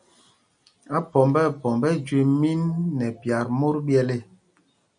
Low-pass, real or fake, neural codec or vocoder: 9.9 kHz; real; none